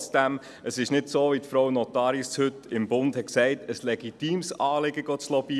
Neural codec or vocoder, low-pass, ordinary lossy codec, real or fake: none; none; none; real